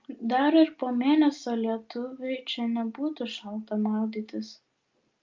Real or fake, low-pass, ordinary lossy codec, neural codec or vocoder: real; 7.2 kHz; Opus, 24 kbps; none